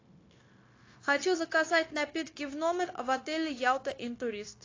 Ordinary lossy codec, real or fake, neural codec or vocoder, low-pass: AAC, 32 kbps; fake; codec, 16 kHz, 0.9 kbps, LongCat-Audio-Codec; 7.2 kHz